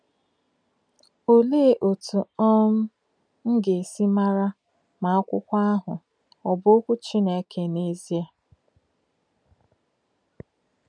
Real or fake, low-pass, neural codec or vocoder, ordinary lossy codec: real; 9.9 kHz; none; none